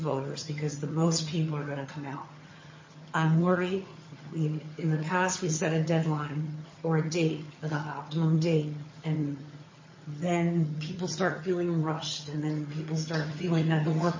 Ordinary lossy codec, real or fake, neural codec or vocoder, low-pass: MP3, 32 kbps; fake; vocoder, 22.05 kHz, 80 mel bands, HiFi-GAN; 7.2 kHz